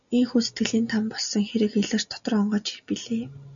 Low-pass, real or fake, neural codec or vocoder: 7.2 kHz; real; none